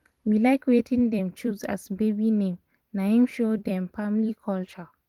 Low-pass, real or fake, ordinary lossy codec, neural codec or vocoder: 19.8 kHz; fake; Opus, 24 kbps; vocoder, 44.1 kHz, 128 mel bands every 256 samples, BigVGAN v2